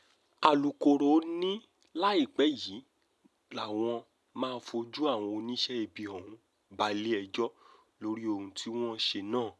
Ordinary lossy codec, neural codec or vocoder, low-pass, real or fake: none; none; none; real